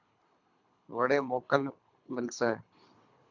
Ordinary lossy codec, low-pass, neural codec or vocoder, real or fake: MP3, 64 kbps; 7.2 kHz; codec, 24 kHz, 3 kbps, HILCodec; fake